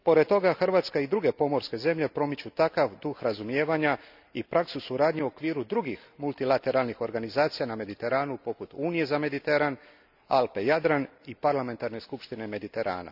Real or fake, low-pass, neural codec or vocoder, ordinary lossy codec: real; 5.4 kHz; none; none